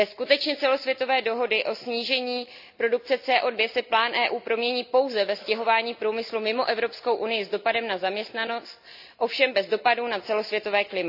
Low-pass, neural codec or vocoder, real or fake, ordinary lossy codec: 5.4 kHz; none; real; none